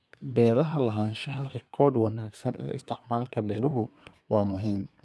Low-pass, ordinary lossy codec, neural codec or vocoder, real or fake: none; none; codec, 24 kHz, 1 kbps, SNAC; fake